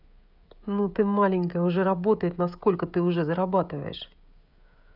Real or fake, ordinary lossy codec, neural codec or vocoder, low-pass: fake; none; codec, 16 kHz, 16 kbps, FreqCodec, smaller model; 5.4 kHz